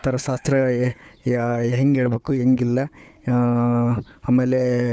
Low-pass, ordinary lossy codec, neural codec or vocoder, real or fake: none; none; codec, 16 kHz, 4 kbps, FunCodec, trained on Chinese and English, 50 frames a second; fake